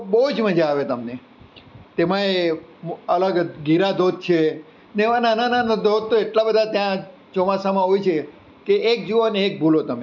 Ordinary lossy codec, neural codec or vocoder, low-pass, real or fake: none; none; 7.2 kHz; real